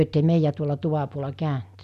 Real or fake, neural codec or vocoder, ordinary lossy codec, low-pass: real; none; none; 14.4 kHz